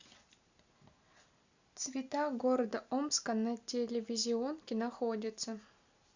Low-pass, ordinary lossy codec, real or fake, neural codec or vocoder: 7.2 kHz; Opus, 64 kbps; real; none